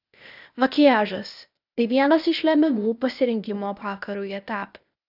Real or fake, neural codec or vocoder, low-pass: fake; codec, 16 kHz, 0.8 kbps, ZipCodec; 5.4 kHz